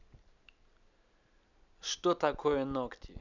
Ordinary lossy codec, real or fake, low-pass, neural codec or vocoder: none; real; 7.2 kHz; none